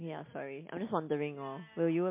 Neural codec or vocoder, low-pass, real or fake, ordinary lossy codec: none; 3.6 kHz; real; MP3, 24 kbps